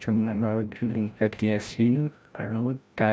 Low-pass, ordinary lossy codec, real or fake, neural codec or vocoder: none; none; fake; codec, 16 kHz, 0.5 kbps, FreqCodec, larger model